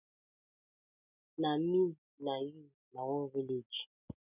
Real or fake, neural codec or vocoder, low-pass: real; none; 3.6 kHz